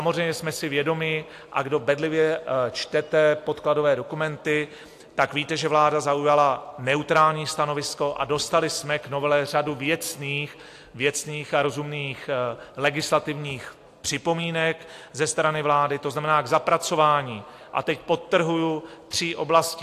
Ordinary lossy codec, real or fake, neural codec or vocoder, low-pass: AAC, 64 kbps; real; none; 14.4 kHz